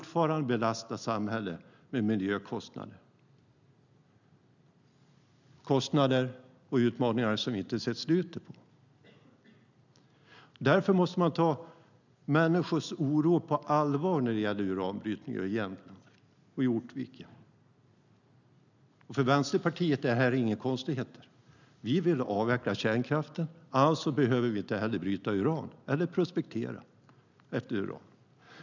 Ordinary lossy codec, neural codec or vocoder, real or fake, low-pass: none; none; real; 7.2 kHz